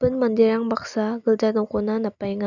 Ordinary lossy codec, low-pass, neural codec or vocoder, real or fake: none; 7.2 kHz; none; real